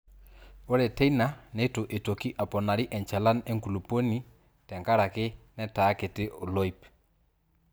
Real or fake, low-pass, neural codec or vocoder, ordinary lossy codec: real; none; none; none